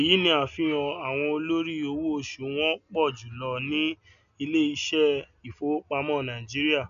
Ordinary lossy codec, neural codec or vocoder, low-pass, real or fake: none; none; 7.2 kHz; real